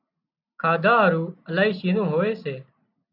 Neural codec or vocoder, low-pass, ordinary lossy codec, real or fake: none; 5.4 kHz; AAC, 48 kbps; real